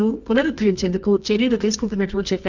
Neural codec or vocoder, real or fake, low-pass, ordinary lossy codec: codec, 24 kHz, 0.9 kbps, WavTokenizer, medium music audio release; fake; 7.2 kHz; none